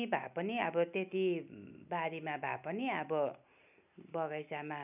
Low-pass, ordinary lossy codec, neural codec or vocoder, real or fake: 3.6 kHz; none; none; real